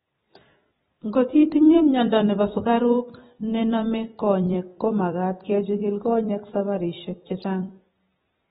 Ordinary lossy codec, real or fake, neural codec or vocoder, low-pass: AAC, 16 kbps; fake; vocoder, 44.1 kHz, 128 mel bands every 256 samples, BigVGAN v2; 19.8 kHz